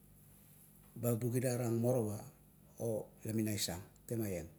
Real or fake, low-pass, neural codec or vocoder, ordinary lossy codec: real; none; none; none